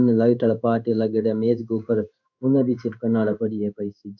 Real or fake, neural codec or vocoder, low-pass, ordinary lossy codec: fake; codec, 16 kHz in and 24 kHz out, 1 kbps, XY-Tokenizer; 7.2 kHz; none